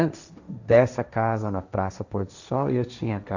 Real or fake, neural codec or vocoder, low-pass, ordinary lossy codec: fake; codec, 16 kHz, 1.1 kbps, Voila-Tokenizer; 7.2 kHz; none